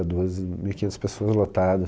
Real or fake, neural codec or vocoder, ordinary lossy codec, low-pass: real; none; none; none